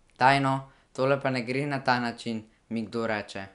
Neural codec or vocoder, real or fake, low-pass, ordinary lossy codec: none; real; 10.8 kHz; none